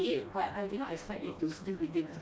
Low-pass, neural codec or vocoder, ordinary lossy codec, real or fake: none; codec, 16 kHz, 1 kbps, FreqCodec, smaller model; none; fake